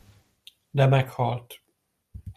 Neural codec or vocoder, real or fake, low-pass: vocoder, 44.1 kHz, 128 mel bands every 256 samples, BigVGAN v2; fake; 14.4 kHz